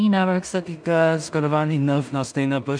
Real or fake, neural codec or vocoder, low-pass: fake; codec, 16 kHz in and 24 kHz out, 0.4 kbps, LongCat-Audio-Codec, two codebook decoder; 9.9 kHz